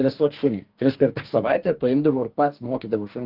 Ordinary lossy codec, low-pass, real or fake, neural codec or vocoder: Opus, 32 kbps; 5.4 kHz; fake; codec, 44.1 kHz, 2.6 kbps, DAC